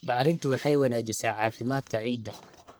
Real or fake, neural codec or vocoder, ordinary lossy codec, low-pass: fake; codec, 44.1 kHz, 1.7 kbps, Pupu-Codec; none; none